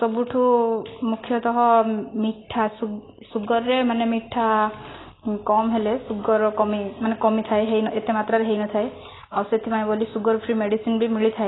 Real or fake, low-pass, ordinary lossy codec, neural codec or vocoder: real; 7.2 kHz; AAC, 16 kbps; none